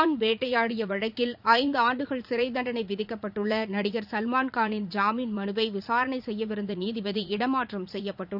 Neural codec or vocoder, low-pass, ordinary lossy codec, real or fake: vocoder, 44.1 kHz, 80 mel bands, Vocos; 5.4 kHz; none; fake